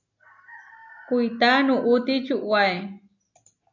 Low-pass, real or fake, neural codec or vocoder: 7.2 kHz; real; none